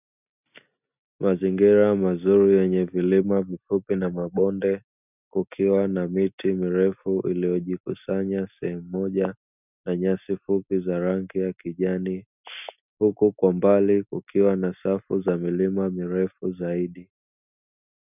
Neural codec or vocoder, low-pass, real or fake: none; 3.6 kHz; real